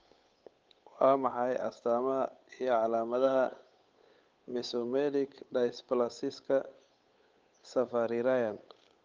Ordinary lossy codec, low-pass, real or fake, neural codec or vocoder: Opus, 24 kbps; 7.2 kHz; fake; codec, 16 kHz, 8 kbps, FunCodec, trained on Chinese and English, 25 frames a second